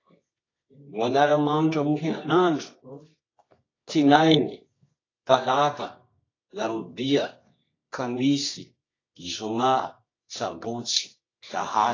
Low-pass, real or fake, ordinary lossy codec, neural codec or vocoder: 7.2 kHz; fake; AAC, 32 kbps; codec, 24 kHz, 0.9 kbps, WavTokenizer, medium music audio release